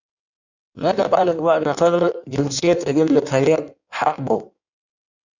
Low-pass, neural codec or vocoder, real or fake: 7.2 kHz; codec, 16 kHz in and 24 kHz out, 1.1 kbps, FireRedTTS-2 codec; fake